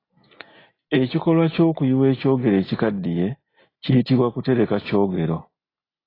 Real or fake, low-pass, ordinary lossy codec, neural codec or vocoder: real; 5.4 kHz; AAC, 24 kbps; none